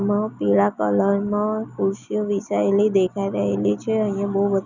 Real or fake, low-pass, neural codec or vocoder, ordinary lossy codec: real; 7.2 kHz; none; none